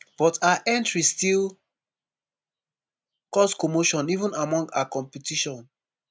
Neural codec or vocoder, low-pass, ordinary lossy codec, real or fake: none; none; none; real